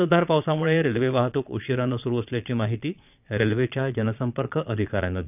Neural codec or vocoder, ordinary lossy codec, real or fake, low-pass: vocoder, 22.05 kHz, 80 mel bands, Vocos; none; fake; 3.6 kHz